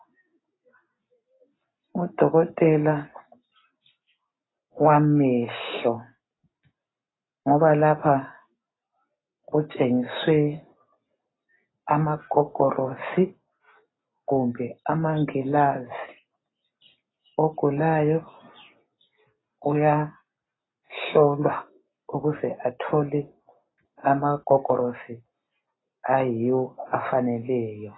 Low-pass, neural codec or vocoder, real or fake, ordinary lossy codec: 7.2 kHz; none; real; AAC, 16 kbps